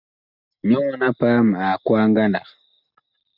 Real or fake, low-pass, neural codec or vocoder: real; 5.4 kHz; none